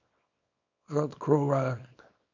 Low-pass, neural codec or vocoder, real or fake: 7.2 kHz; codec, 24 kHz, 0.9 kbps, WavTokenizer, small release; fake